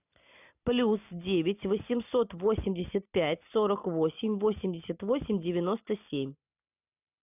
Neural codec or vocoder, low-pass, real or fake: none; 3.6 kHz; real